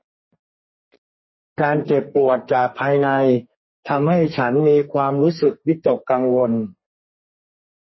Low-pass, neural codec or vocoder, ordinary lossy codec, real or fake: 7.2 kHz; codec, 32 kHz, 1.9 kbps, SNAC; MP3, 24 kbps; fake